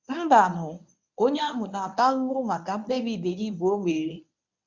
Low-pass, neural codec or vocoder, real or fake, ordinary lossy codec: 7.2 kHz; codec, 24 kHz, 0.9 kbps, WavTokenizer, medium speech release version 1; fake; none